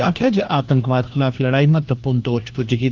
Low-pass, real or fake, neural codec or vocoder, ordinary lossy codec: 7.2 kHz; fake; codec, 16 kHz, 1 kbps, FunCodec, trained on LibriTTS, 50 frames a second; Opus, 32 kbps